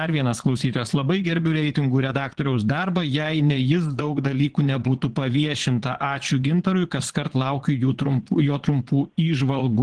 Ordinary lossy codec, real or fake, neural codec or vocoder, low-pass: Opus, 16 kbps; fake; vocoder, 22.05 kHz, 80 mel bands, Vocos; 9.9 kHz